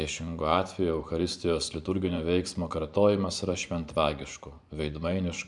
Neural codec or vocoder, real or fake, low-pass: vocoder, 24 kHz, 100 mel bands, Vocos; fake; 10.8 kHz